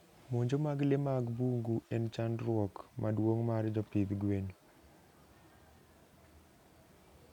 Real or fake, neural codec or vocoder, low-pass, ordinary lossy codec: real; none; 19.8 kHz; none